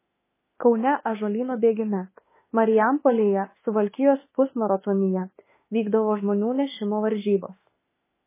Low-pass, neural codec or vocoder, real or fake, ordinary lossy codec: 3.6 kHz; autoencoder, 48 kHz, 32 numbers a frame, DAC-VAE, trained on Japanese speech; fake; MP3, 16 kbps